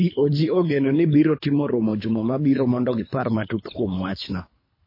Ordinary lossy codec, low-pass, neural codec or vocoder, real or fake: MP3, 24 kbps; 5.4 kHz; codec, 24 kHz, 3 kbps, HILCodec; fake